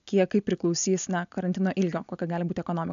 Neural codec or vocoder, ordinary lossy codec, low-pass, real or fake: none; AAC, 96 kbps; 7.2 kHz; real